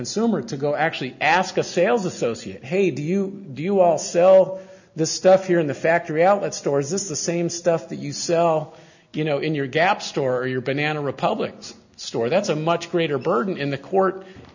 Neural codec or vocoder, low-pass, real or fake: none; 7.2 kHz; real